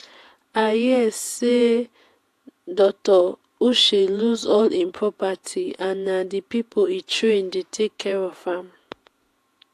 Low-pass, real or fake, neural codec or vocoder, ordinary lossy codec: 14.4 kHz; fake; vocoder, 48 kHz, 128 mel bands, Vocos; AAC, 64 kbps